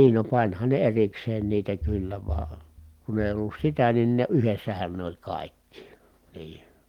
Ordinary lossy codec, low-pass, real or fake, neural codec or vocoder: Opus, 24 kbps; 19.8 kHz; real; none